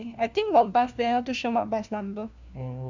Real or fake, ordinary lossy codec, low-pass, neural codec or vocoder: fake; none; 7.2 kHz; codec, 16 kHz, 1 kbps, FunCodec, trained on LibriTTS, 50 frames a second